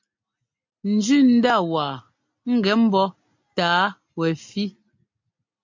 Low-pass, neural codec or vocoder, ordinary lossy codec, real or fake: 7.2 kHz; none; MP3, 48 kbps; real